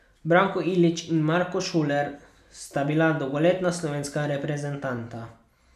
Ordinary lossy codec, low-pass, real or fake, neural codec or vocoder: none; 14.4 kHz; real; none